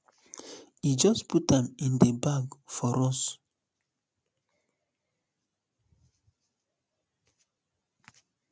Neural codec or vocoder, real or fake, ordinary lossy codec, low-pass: none; real; none; none